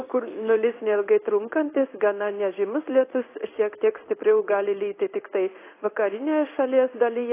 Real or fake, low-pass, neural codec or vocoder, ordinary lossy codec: fake; 3.6 kHz; codec, 16 kHz in and 24 kHz out, 1 kbps, XY-Tokenizer; AAC, 24 kbps